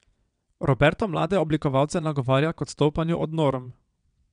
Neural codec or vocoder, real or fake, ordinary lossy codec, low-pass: vocoder, 22.05 kHz, 80 mel bands, WaveNeXt; fake; none; 9.9 kHz